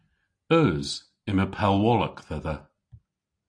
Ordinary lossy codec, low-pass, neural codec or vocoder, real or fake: AAC, 48 kbps; 9.9 kHz; none; real